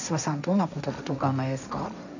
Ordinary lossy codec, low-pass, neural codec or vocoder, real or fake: none; 7.2 kHz; codec, 16 kHz, 1.1 kbps, Voila-Tokenizer; fake